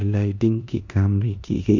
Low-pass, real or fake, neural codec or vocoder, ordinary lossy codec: 7.2 kHz; fake; codec, 16 kHz in and 24 kHz out, 0.9 kbps, LongCat-Audio-Codec, four codebook decoder; none